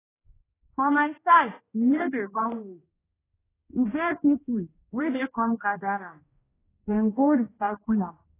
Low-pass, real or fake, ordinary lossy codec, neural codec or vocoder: 3.6 kHz; fake; AAC, 16 kbps; codec, 16 kHz, 1 kbps, X-Codec, HuBERT features, trained on general audio